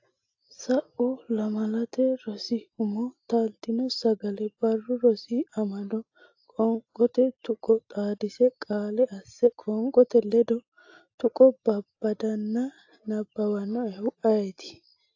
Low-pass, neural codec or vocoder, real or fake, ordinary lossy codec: 7.2 kHz; none; real; AAC, 48 kbps